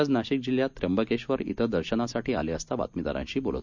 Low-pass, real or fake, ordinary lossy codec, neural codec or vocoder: 7.2 kHz; real; MP3, 64 kbps; none